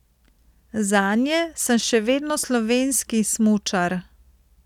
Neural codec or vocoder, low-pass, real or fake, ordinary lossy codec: none; 19.8 kHz; real; none